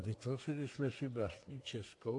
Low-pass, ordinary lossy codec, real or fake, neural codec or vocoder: 10.8 kHz; AAC, 48 kbps; fake; codec, 24 kHz, 1 kbps, SNAC